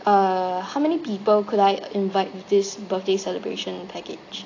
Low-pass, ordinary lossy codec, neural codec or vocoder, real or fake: 7.2 kHz; AAC, 32 kbps; none; real